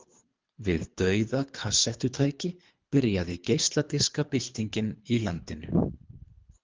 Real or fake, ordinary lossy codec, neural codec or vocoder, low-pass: fake; Opus, 32 kbps; codec, 24 kHz, 3 kbps, HILCodec; 7.2 kHz